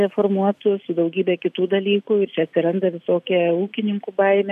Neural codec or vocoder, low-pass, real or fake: none; 14.4 kHz; real